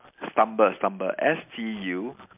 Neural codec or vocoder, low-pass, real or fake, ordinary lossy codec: none; 3.6 kHz; real; MP3, 32 kbps